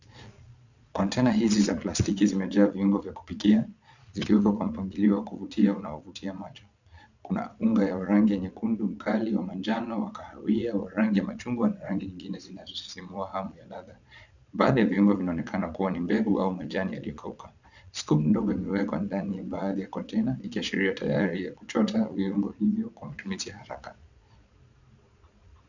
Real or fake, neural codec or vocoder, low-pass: fake; vocoder, 22.05 kHz, 80 mel bands, Vocos; 7.2 kHz